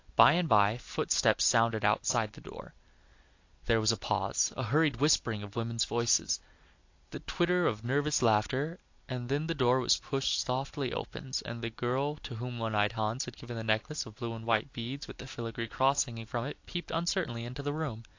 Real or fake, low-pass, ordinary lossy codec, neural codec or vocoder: real; 7.2 kHz; AAC, 48 kbps; none